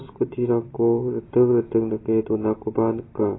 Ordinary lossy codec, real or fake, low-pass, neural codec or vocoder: AAC, 16 kbps; real; 7.2 kHz; none